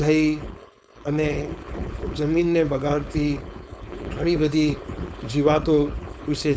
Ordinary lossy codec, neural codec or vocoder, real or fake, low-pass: none; codec, 16 kHz, 4.8 kbps, FACodec; fake; none